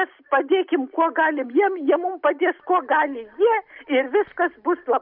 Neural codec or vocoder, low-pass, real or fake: none; 5.4 kHz; real